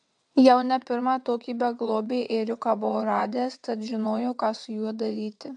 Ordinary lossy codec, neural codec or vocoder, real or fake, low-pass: AAC, 64 kbps; vocoder, 22.05 kHz, 80 mel bands, WaveNeXt; fake; 9.9 kHz